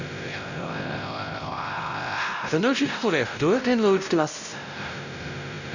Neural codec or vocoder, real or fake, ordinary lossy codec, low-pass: codec, 16 kHz, 0.5 kbps, X-Codec, WavLM features, trained on Multilingual LibriSpeech; fake; none; 7.2 kHz